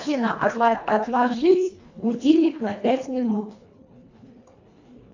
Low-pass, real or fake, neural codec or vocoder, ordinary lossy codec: 7.2 kHz; fake; codec, 24 kHz, 1.5 kbps, HILCodec; AAC, 48 kbps